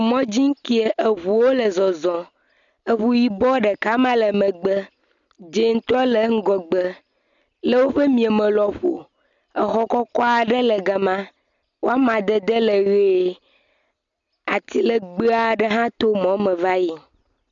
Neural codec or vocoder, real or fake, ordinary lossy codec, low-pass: none; real; MP3, 96 kbps; 7.2 kHz